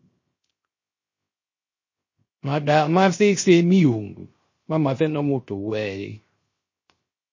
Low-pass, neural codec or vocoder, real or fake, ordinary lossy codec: 7.2 kHz; codec, 16 kHz, 0.3 kbps, FocalCodec; fake; MP3, 32 kbps